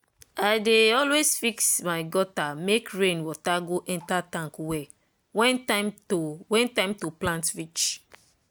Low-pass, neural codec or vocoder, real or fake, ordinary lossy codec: none; none; real; none